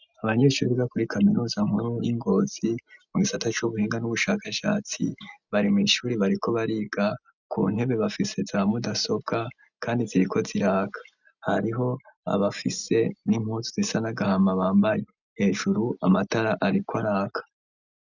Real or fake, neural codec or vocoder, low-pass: real; none; 7.2 kHz